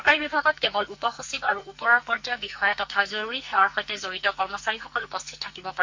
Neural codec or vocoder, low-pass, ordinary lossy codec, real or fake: codec, 44.1 kHz, 2.6 kbps, SNAC; 7.2 kHz; MP3, 48 kbps; fake